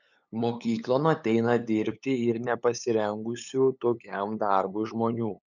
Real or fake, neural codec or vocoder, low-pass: fake; codec, 16 kHz, 8 kbps, FunCodec, trained on LibriTTS, 25 frames a second; 7.2 kHz